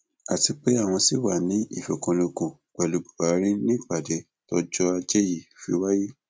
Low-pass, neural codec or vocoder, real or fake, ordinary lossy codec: none; none; real; none